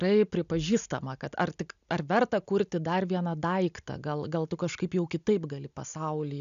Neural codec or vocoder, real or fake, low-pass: none; real; 7.2 kHz